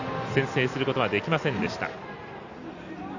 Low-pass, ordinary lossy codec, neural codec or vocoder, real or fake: 7.2 kHz; none; none; real